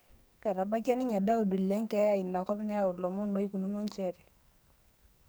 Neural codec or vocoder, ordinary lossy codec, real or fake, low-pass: codec, 44.1 kHz, 2.6 kbps, SNAC; none; fake; none